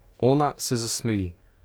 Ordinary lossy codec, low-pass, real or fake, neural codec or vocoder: none; none; fake; codec, 44.1 kHz, 2.6 kbps, DAC